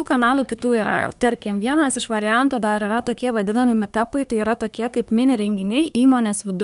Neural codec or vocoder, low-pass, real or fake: codec, 24 kHz, 1 kbps, SNAC; 10.8 kHz; fake